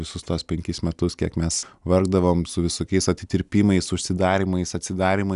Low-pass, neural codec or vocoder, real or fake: 10.8 kHz; none; real